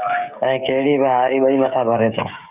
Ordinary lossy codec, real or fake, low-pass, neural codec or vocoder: Opus, 64 kbps; fake; 3.6 kHz; codec, 16 kHz, 16 kbps, FreqCodec, smaller model